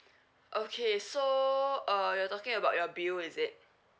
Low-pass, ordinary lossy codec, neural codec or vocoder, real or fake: none; none; none; real